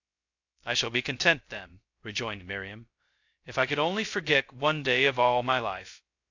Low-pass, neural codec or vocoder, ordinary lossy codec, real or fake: 7.2 kHz; codec, 16 kHz, 0.2 kbps, FocalCodec; AAC, 48 kbps; fake